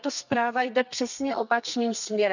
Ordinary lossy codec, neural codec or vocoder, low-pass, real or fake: none; codec, 32 kHz, 1.9 kbps, SNAC; 7.2 kHz; fake